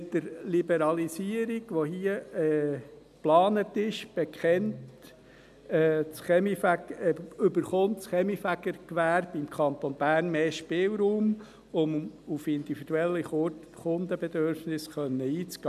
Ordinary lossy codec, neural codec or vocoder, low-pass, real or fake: none; none; 14.4 kHz; real